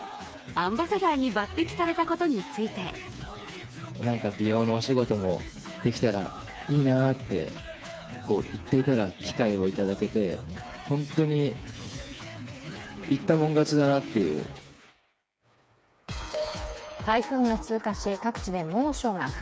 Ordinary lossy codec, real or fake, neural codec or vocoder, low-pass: none; fake; codec, 16 kHz, 4 kbps, FreqCodec, smaller model; none